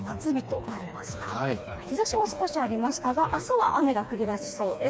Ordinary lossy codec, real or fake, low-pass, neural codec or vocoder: none; fake; none; codec, 16 kHz, 2 kbps, FreqCodec, smaller model